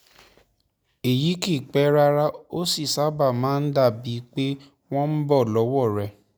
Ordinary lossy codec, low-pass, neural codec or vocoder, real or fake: none; none; none; real